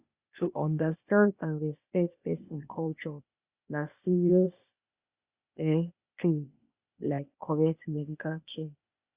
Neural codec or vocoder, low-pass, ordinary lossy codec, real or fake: codec, 16 kHz, 0.8 kbps, ZipCodec; 3.6 kHz; Opus, 64 kbps; fake